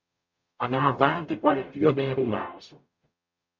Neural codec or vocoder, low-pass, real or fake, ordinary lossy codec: codec, 44.1 kHz, 0.9 kbps, DAC; 7.2 kHz; fake; MP3, 48 kbps